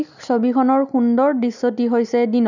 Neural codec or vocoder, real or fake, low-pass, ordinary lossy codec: none; real; 7.2 kHz; none